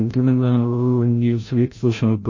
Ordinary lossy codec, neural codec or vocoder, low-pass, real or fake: MP3, 32 kbps; codec, 16 kHz, 0.5 kbps, FreqCodec, larger model; 7.2 kHz; fake